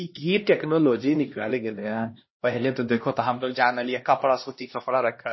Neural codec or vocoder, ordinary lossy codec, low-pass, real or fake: codec, 16 kHz, 1 kbps, X-Codec, HuBERT features, trained on LibriSpeech; MP3, 24 kbps; 7.2 kHz; fake